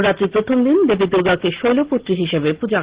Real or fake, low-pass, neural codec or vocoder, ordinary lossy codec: real; 3.6 kHz; none; Opus, 16 kbps